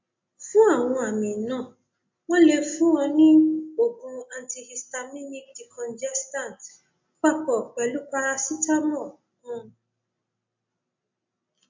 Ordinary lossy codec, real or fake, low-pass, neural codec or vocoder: MP3, 48 kbps; real; 7.2 kHz; none